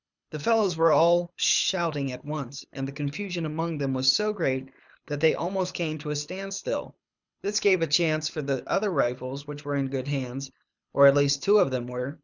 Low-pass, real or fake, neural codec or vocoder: 7.2 kHz; fake; codec, 24 kHz, 6 kbps, HILCodec